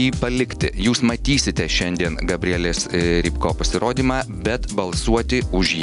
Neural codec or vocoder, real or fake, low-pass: none; real; 10.8 kHz